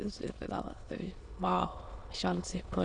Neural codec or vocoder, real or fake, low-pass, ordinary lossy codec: autoencoder, 22.05 kHz, a latent of 192 numbers a frame, VITS, trained on many speakers; fake; 9.9 kHz; Opus, 64 kbps